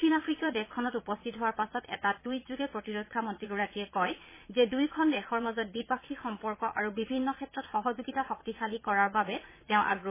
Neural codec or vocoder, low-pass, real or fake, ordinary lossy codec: vocoder, 22.05 kHz, 80 mel bands, Vocos; 3.6 kHz; fake; MP3, 16 kbps